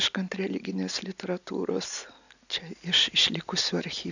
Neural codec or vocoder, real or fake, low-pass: none; real; 7.2 kHz